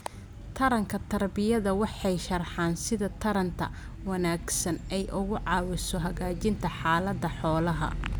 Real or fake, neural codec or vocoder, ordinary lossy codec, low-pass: real; none; none; none